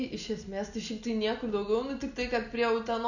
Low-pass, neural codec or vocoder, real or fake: 7.2 kHz; none; real